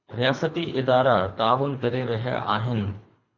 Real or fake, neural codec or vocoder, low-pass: fake; codec, 24 kHz, 3 kbps, HILCodec; 7.2 kHz